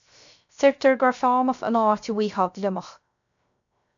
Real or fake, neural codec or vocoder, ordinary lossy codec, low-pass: fake; codec, 16 kHz, 0.3 kbps, FocalCodec; MP3, 64 kbps; 7.2 kHz